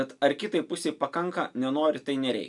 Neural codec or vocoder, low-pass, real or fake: none; 10.8 kHz; real